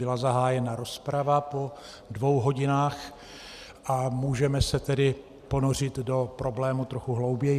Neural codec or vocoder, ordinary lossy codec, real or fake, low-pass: none; Opus, 64 kbps; real; 14.4 kHz